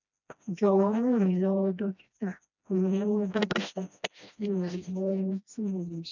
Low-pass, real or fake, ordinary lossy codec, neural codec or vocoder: 7.2 kHz; fake; none; codec, 16 kHz, 1 kbps, FreqCodec, smaller model